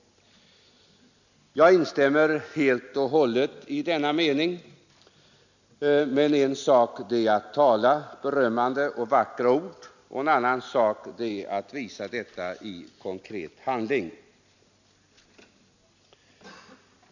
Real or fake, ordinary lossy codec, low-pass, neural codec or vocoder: real; none; 7.2 kHz; none